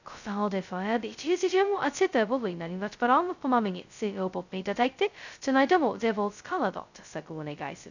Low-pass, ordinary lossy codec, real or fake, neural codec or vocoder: 7.2 kHz; none; fake; codec, 16 kHz, 0.2 kbps, FocalCodec